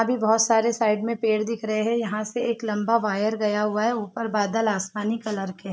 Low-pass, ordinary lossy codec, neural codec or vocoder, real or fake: none; none; none; real